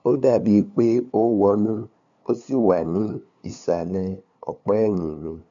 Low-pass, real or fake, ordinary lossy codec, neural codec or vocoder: 7.2 kHz; fake; none; codec, 16 kHz, 2 kbps, FunCodec, trained on LibriTTS, 25 frames a second